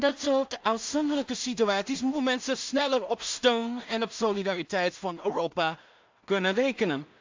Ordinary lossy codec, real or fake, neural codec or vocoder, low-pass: MP3, 64 kbps; fake; codec, 16 kHz in and 24 kHz out, 0.4 kbps, LongCat-Audio-Codec, two codebook decoder; 7.2 kHz